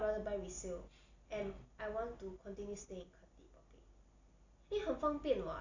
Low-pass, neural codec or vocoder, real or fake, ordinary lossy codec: 7.2 kHz; none; real; none